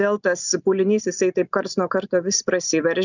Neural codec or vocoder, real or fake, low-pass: none; real; 7.2 kHz